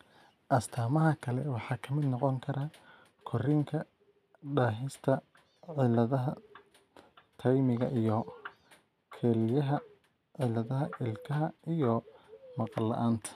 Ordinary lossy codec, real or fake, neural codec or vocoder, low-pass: none; real; none; 14.4 kHz